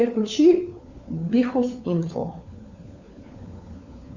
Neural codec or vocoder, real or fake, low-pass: codec, 16 kHz, 4 kbps, FunCodec, trained on LibriTTS, 50 frames a second; fake; 7.2 kHz